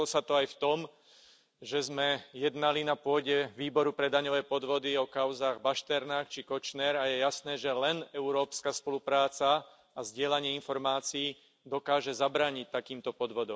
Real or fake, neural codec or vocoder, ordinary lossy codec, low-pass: real; none; none; none